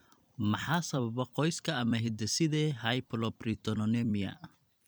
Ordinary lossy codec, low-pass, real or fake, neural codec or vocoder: none; none; fake; vocoder, 44.1 kHz, 128 mel bands every 256 samples, BigVGAN v2